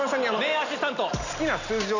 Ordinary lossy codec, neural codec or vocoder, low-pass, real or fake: none; none; 7.2 kHz; real